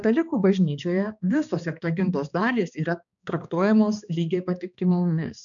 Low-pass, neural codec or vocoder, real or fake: 7.2 kHz; codec, 16 kHz, 2 kbps, X-Codec, HuBERT features, trained on balanced general audio; fake